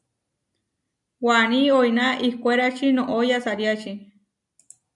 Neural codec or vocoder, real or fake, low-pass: none; real; 10.8 kHz